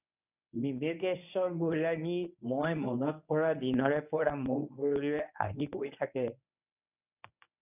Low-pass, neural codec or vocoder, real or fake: 3.6 kHz; codec, 24 kHz, 0.9 kbps, WavTokenizer, medium speech release version 1; fake